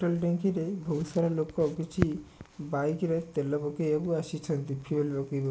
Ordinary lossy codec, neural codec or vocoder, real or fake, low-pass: none; none; real; none